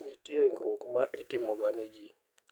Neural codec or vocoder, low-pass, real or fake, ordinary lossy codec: codec, 44.1 kHz, 2.6 kbps, SNAC; none; fake; none